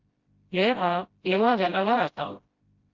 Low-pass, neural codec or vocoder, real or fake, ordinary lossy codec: 7.2 kHz; codec, 16 kHz, 0.5 kbps, FreqCodec, smaller model; fake; Opus, 32 kbps